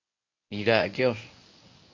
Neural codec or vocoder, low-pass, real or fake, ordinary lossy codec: codec, 24 kHz, 0.9 kbps, WavTokenizer, medium speech release version 1; 7.2 kHz; fake; MP3, 48 kbps